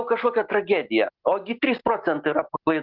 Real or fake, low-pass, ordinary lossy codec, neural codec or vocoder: real; 5.4 kHz; Opus, 32 kbps; none